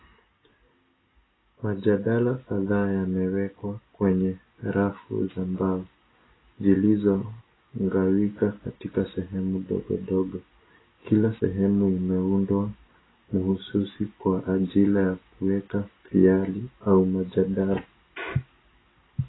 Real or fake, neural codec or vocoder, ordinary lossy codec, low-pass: real; none; AAC, 16 kbps; 7.2 kHz